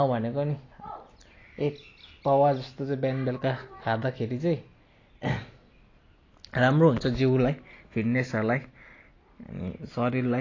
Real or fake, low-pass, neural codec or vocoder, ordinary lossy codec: real; 7.2 kHz; none; AAC, 32 kbps